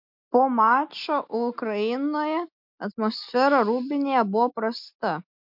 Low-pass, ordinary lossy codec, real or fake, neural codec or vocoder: 5.4 kHz; MP3, 48 kbps; real; none